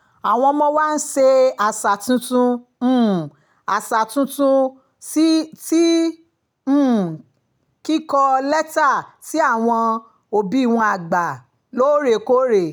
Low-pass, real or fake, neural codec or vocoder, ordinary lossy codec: none; real; none; none